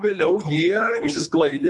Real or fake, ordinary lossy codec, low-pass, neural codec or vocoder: fake; MP3, 96 kbps; 10.8 kHz; codec, 24 kHz, 3 kbps, HILCodec